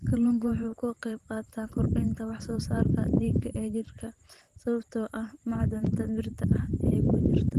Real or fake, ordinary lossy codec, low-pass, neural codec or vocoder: fake; Opus, 24 kbps; 14.4 kHz; vocoder, 44.1 kHz, 128 mel bands every 512 samples, BigVGAN v2